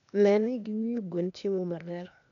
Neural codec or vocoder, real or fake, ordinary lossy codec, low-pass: codec, 16 kHz, 0.8 kbps, ZipCodec; fake; none; 7.2 kHz